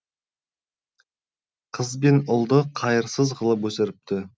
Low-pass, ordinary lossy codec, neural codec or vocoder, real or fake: none; none; none; real